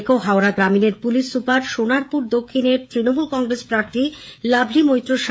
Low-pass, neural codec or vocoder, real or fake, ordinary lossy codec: none; codec, 16 kHz, 16 kbps, FreqCodec, smaller model; fake; none